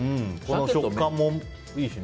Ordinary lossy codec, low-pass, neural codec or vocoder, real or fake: none; none; none; real